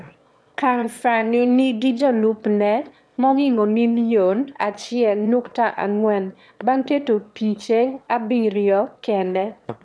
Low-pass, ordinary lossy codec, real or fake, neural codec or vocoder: none; none; fake; autoencoder, 22.05 kHz, a latent of 192 numbers a frame, VITS, trained on one speaker